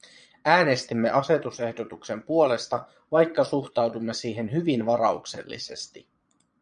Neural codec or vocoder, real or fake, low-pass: vocoder, 22.05 kHz, 80 mel bands, Vocos; fake; 9.9 kHz